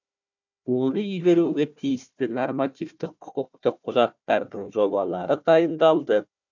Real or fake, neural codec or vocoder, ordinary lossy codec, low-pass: fake; codec, 16 kHz, 1 kbps, FunCodec, trained on Chinese and English, 50 frames a second; none; 7.2 kHz